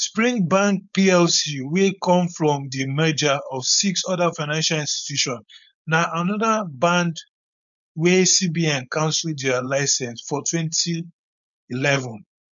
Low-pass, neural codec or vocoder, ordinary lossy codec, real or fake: 7.2 kHz; codec, 16 kHz, 4.8 kbps, FACodec; none; fake